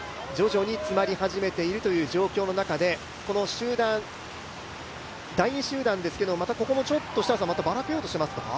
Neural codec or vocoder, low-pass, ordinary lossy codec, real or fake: none; none; none; real